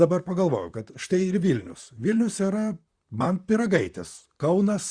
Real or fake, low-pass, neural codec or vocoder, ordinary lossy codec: fake; 9.9 kHz; vocoder, 44.1 kHz, 128 mel bands, Pupu-Vocoder; Opus, 64 kbps